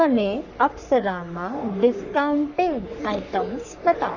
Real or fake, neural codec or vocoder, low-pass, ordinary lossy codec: fake; codec, 44.1 kHz, 3.4 kbps, Pupu-Codec; 7.2 kHz; none